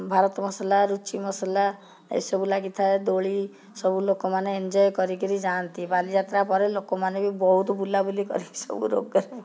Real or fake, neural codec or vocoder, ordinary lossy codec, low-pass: real; none; none; none